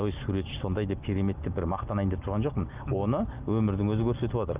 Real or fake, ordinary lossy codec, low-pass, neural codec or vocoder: real; Opus, 24 kbps; 3.6 kHz; none